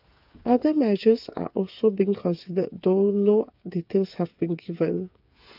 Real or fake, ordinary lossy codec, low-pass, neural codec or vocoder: fake; AAC, 48 kbps; 5.4 kHz; codec, 16 kHz, 8 kbps, FreqCodec, smaller model